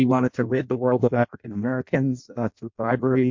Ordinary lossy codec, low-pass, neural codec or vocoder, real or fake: MP3, 48 kbps; 7.2 kHz; codec, 16 kHz in and 24 kHz out, 0.6 kbps, FireRedTTS-2 codec; fake